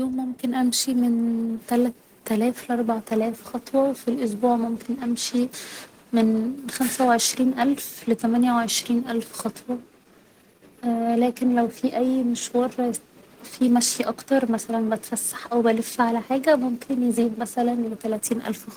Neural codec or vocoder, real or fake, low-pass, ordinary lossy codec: none; real; 19.8 kHz; Opus, 16 kbps